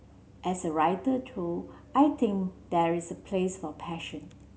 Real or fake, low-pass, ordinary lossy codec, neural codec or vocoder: real; none; none; none